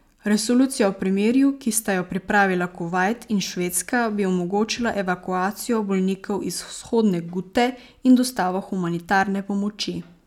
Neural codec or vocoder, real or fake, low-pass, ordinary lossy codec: none; real; 19.8 kHz; none